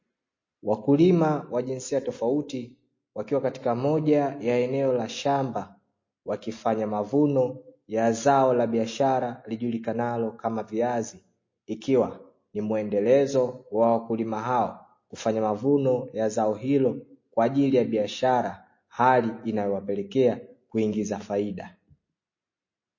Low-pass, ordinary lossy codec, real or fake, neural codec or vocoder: 7.2 kHz; MP3, 32 kbps; real; none